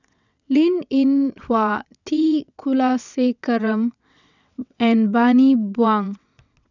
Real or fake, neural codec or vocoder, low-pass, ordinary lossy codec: fake; vocoder, 22.05 kHz, 80 mel bands, WaveNeXt; 7.2 kHz; none